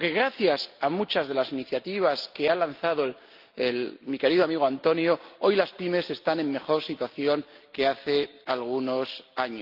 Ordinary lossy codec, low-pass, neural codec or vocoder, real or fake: Opus, 24 kbps; 5.4 kHz; none; real